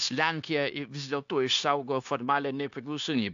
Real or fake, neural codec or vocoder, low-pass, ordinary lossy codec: fake; codec, 16 kHz, 0.9 kbps, LongCat-Audio-Codec; 7.2 kHz; AAC, 64 kbps